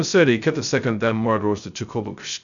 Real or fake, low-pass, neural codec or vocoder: fake; 7.2 kHz; codec, 16 kHz, 0.2 kbps, FocalCodec